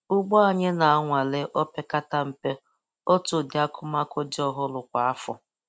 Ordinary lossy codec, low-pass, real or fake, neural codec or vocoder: none; none; real; none